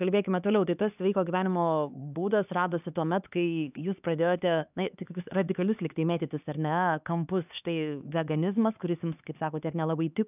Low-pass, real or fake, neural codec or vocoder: 3.6 kHz; fake; codec, 16 kHz, 4 kbps, X-Codec, HuBERT features, trained on LibriSpeech